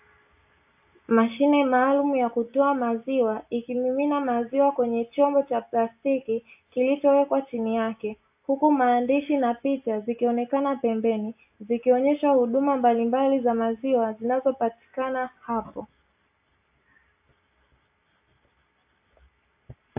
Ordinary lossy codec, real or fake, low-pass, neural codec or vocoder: AAC, 32 kbps; real; 3.6 kHz; none